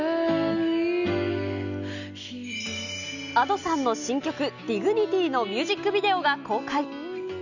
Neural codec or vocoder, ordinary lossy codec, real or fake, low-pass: none; none; real; 7.2 kHz